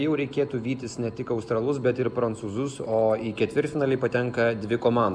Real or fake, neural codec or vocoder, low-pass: real; none; 9.9 kHz